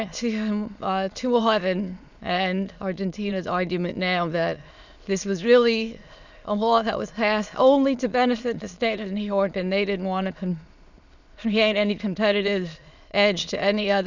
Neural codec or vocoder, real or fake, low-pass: autoencoder, 22.05 kHz, a latent of 192 numbers a frame, VITS, trained on many speakers; fake; 7.2 kHz